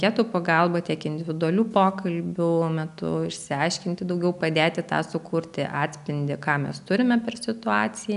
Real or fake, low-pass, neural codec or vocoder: real; 10.8 kHz; none